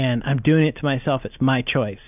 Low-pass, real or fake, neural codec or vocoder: 3.6 kHz; real; none